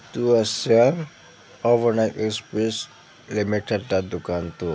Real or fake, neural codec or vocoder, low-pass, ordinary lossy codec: real; none; none; none